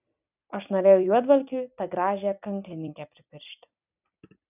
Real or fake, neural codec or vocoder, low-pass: fake; vocoder, 44.1 kHz, 128 mel bands every 256 samples, BigVGAN v2; 3.6 kHz